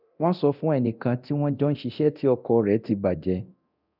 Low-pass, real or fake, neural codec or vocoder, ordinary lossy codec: 5.4 kHz; fake; codec, 24 kHz, 0.9 kbps, DualCodec; none